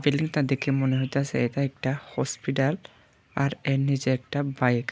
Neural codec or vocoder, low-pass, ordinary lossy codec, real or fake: none; none; none; real